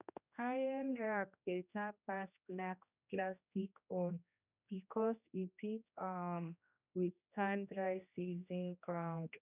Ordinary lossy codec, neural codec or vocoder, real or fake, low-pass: none; codec, 16 kHz, 1 kbps, X-Codec, HuBERT features, trained on general audio; fake; 3.6 kHz